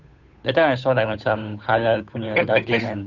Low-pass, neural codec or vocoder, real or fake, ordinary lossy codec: 7.2 kHz; codec, 16 kHz, 8 kbps, FunCodec, trained on Chinese and English, 25 frames a second; fake; none